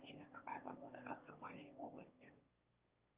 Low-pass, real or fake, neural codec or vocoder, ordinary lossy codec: 3.6 kHz; fake; autoencoder, 22.05 kHz, a latent of 192 numbers a frame, VITS, trained on one speaker; Opus, 32 kbps